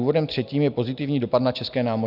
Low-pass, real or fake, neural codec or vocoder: 5.4 kHz; real; none